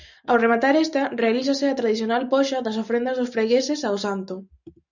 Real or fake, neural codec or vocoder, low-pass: real; none; 7.2 kHz